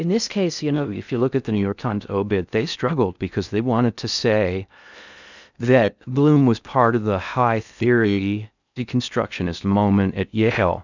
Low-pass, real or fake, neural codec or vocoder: 7.2 kHz; fake; codec, 16 kHz in and 24 kHz out, 0.6 kbps, FocalCodec, streaming, 2048 codes